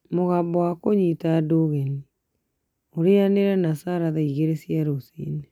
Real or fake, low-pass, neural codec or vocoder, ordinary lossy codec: real; 19.8 kHz; none; none